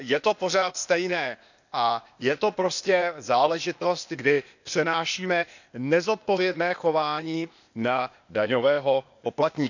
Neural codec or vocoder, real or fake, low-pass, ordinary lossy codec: codec, 16 kHz, 0.8 kbps, ZipCodec; fake; 7.2 kHz; none